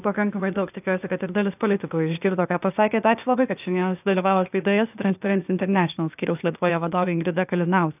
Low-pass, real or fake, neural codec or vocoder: 3.6 kHz; fake; codec, 16 kHz, 0.8 kbps, ZipCodec